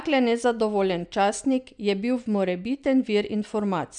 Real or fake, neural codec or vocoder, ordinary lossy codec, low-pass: real; none; none; 9.9 kHz